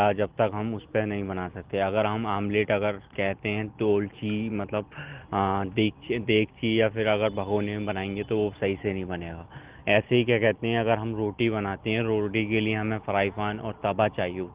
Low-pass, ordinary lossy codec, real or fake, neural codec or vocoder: 3.6 kHz; Opus, 16 kbps; real; none